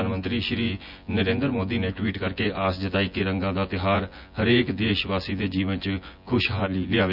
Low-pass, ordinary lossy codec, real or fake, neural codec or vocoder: 5.4 kHz; none; fake; vocoder, 24 kHz, 100 mel bands, Vocos